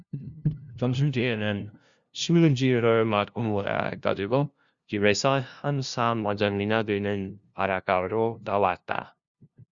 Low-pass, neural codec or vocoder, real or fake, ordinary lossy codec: 7.2 kHz; codec, 16 kHz, 0.5 kbps, FunCodec, trained on LibriTTS, 25 frames a second; fake; Opus, 64 kbps